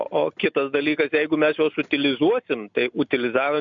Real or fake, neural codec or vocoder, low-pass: real; none; 7.2 kHz